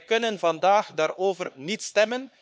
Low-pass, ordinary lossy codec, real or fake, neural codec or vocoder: none; none; fake; codec, 16 kHz, 4 kbps, X-Codec, HuBERT features, trained on LibriSpeech